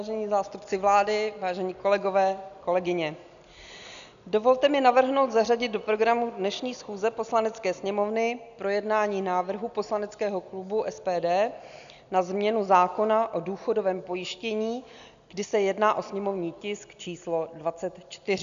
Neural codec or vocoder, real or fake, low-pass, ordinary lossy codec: none; real; 7.2 kHz; MP3, 96 kbps